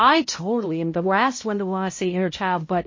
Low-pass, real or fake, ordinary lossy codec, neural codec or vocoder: 7.2 kHz; fake; MP3, 32 kbps; codec, 16 kHz, 0.5 kbps, X-Codec, HuBERT features, trained on balanced general audio